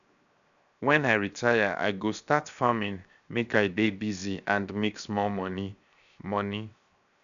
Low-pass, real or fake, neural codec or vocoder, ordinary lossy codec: 7.2 kHz; fake; codec, 16 kHz, 0.7 kbps, FocalCodec; none